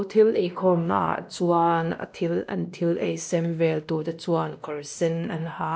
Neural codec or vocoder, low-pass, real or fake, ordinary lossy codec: codec, 16 kHz, 1 kbps, X-Codec, WavLM features, trained on Multilingual LibriSpeech; none; fake; none